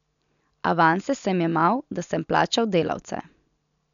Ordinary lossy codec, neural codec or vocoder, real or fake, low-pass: none; none; real; 7.2 kHz